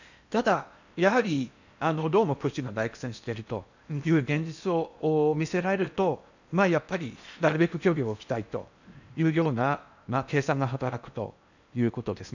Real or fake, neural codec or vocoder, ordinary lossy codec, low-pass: fake; codec, 16 kHz in and 24 kHz out, 0.8 kbps, FocalCodec, streaming, 65536 codes; none; 7.2 kHz